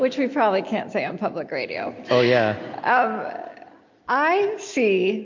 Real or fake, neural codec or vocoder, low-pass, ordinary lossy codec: real; none; 7.2 kHz; MP3, 48 kbps